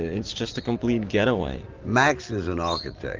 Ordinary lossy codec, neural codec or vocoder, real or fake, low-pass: Opus, 32 kbps; none; real; 7.2 kHz